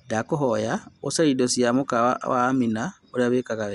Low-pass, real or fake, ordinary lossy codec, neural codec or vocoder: 10.8 kHz; real; Opus, 64 kbps; none